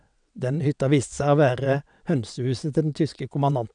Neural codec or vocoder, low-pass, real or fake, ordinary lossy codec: vocoder, 22.05 kHz, 80 mel bands, WaveNeXt; 9.9 kHz; fake; none